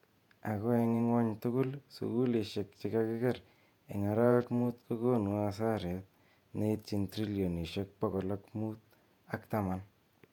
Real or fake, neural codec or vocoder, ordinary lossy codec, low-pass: fake; vocoder, 48 kHz, 128 mel bands, Vocos; MP3, 96 kbps; 19.8 kHz